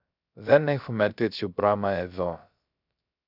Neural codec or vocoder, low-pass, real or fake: codec, 16 kHz, 0.3 kbps, FocalCodec; 5.4 kHz; fake